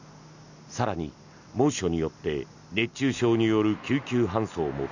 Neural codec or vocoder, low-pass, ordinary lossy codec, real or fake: none; 7.2 kHz; none; real